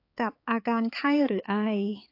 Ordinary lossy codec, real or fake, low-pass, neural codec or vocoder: AAC, 32 kbps; fake; 5.4 kHz; codec, 16 kHz, 4 kbps, X-Codec, HuBERT features, trained on balanced general audio